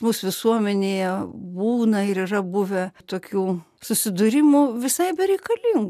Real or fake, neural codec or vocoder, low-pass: real; none; 14.4 kHz